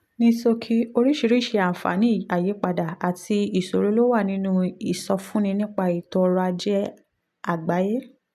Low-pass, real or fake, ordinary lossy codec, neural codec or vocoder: 14.4 kHz; real; none; none